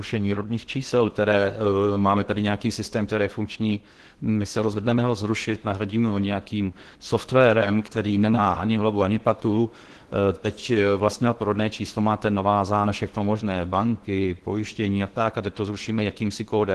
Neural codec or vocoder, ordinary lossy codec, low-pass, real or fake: codec, 16 kHz in and 24 kHz out, 0.8 kbps, FocalCodec, streaming, 65536 codes; Opus, 16 kbps; 10.8 kHz; fake